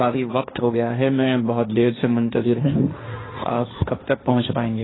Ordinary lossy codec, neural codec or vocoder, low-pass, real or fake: AAC, 16 kbps; codec, 16 kHz, 1.1 kbps, Voila-Tokenizer; 7.2 kHz; fake